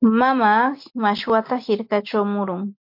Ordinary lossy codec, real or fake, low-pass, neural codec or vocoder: MP3, 32 kbps; real; 5.4 kHz; none